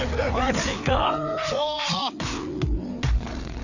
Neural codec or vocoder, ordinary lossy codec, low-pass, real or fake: codec, 16 kHz, 4 kbps, FreqCodec, larger model; none; 7.2 kHz; fake